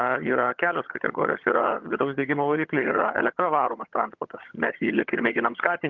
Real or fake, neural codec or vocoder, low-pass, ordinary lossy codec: fake; vocoder, 22.05 kHz, 80 mel bands, HiFi-GAN; 7.2 kHz; Opus, 24 kbps